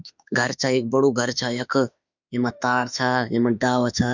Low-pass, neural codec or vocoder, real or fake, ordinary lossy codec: 7.2 kHz; autoencoder, 48 kHz, 32 numbers a frame, DAC-VAE, trained on Japanese speech; fake; none